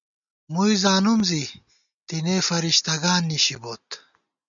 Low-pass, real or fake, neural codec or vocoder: 7.2 kHz; real; none